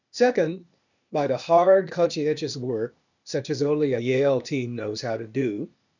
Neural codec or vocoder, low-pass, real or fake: codec, 16 kHz, 0.8 kbps, ZipCodec; 7.2 kHz; fake